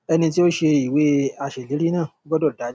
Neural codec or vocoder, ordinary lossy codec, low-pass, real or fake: none; none; none; real